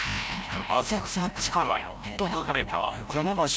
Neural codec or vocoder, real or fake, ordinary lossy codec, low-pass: codec, 16 kHz, 0.5 kbps, FreqCodec, larger model; fake; none; none